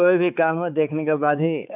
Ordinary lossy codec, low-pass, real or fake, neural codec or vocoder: none; 3.6 kHz; fake; codec, 16 kHz, 4 kbps, X-Codec, HuBERT features, trained on balanced general audio